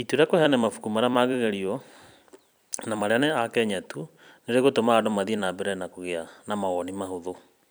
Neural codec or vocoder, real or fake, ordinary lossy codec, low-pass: vocoder, 44.1 kHz, 128 mel bands every 256 samples, BigVGAN v2; fake; none; none